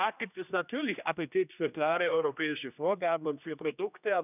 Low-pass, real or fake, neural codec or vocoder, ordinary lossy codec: 3.6 kHz; fake; codec, 16 kHz, 1 kbps, X-Codec, HuBERT features, trained on general audio; none